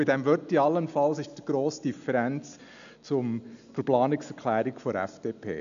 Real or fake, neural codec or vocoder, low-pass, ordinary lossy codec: real; none; 7.2 kHz; none